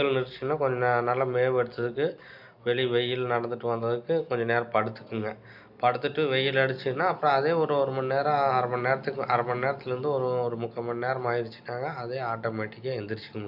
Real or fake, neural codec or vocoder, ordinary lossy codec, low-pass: real; none; none; 5.4 kHz